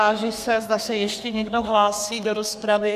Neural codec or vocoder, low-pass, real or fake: codec, 32 kHz, 1.9 kbps, SNAC; 14.4 kHz; fake